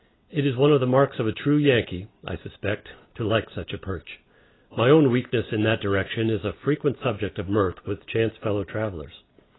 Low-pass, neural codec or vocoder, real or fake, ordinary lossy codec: 7.2 kHz; none; real; AAC, 16 kbps